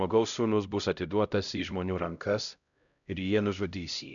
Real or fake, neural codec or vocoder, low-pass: fake; codec, 16 kHz, 0.5 kbps, X-Codec, HuBERT features, trained on LibriSpeech; 7.2 kHz